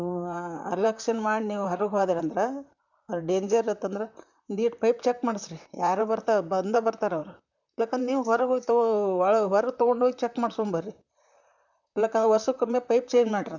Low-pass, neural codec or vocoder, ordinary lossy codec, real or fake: 7.2 kHz; vocoder, 44.1 kHz, 128 mel bands, Pupu-Vocoder; none; fake